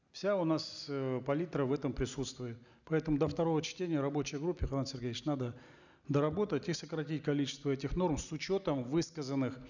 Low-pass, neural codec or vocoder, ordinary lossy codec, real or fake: 7.2 kHz; none; none; real